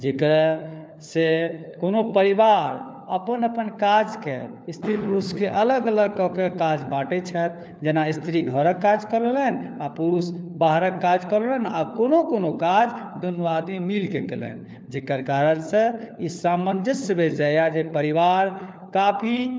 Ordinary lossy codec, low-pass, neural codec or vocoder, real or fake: none; none; codec, 16 kHz, 4 kbps, FunCodec, trained on LibriTTS, 50 frames a second; fake